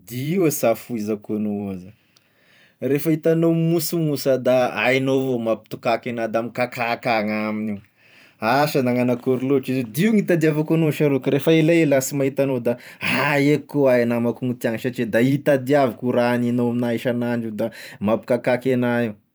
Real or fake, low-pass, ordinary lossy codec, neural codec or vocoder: fake; none; none; vocoder, 44.1 kHz, 128 mel bands every 512 samples, BigVGAN v2